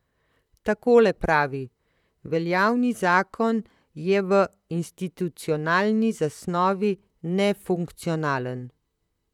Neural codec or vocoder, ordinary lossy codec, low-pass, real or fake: vocoder, 44.1 kHz, 128 mel bands, Pupu-Vocoder; none; 19.8 kHz; fake